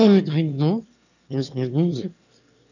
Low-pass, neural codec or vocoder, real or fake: 7.2 kHz; autoencoder, 22.05 kHz, a latent of 192 numbers a frame, VITS, trained on one speaker; fake